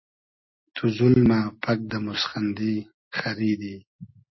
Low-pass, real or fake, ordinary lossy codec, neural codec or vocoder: 7.2 kHz; real; MP3, 24 kbps; none